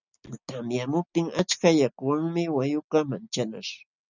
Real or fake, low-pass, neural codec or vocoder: real; 7.2 kHz; none